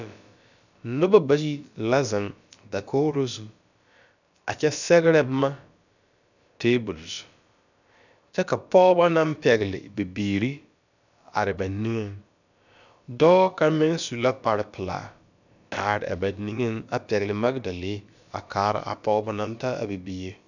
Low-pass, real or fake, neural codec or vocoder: 7.2 kHz; fake; codec, 16 kHz, about 1 kbps, DyCAST, with the encoder's durations